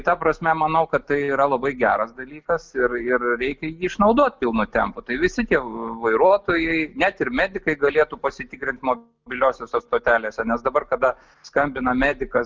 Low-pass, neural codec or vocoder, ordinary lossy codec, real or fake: 7.2 kHz; none; Opus, 24 kbps; real